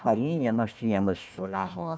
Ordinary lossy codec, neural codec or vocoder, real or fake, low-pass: none; codec, 16 kHz, 1 kbps, FunCodec, trained on Chinese and English, 50 frames a second; fake; none